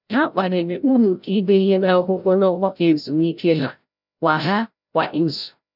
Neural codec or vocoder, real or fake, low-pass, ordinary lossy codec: codec, 16 kHz, 0.5 kbps, FreqCodec, larger model; fake; 5.4 kHz; none